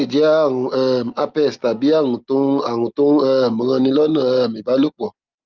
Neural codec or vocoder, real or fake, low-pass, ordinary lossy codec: none; real; 7.2 kHz; Opus, 24 kbps